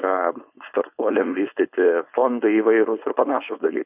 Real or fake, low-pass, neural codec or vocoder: fake; 3.6 kHz; codec, 16 kHz, 4.8 kbps, FACodec